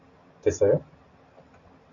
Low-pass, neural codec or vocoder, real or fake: 7.2 kHz; none; real